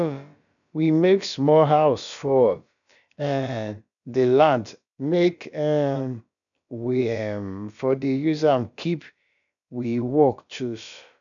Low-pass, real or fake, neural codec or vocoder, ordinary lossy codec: 7.2 kHz; fake; codec, 16 kHz, about 1 kbps, DyCAST, with the encoder's durations; none